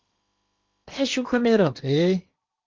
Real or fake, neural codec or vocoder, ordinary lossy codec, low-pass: fake; codec, 16 kHz in and 24 kHz out, 0.8 kbps, FocalCodec, streaming, 65536 codes; Opus, 24 kbps; 7.2 kHz